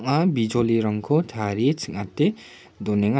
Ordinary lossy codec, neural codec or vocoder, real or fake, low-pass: none; none; real; none